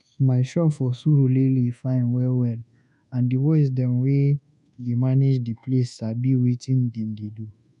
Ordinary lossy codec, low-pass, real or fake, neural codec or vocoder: none; 10.8 kHz; fake; codec, 24 kHz, 1.2 kbps, DualCodec